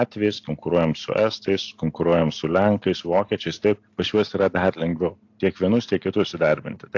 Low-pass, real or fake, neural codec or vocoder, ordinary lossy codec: 7.2 kHz; real; none; AAC, 48 kbps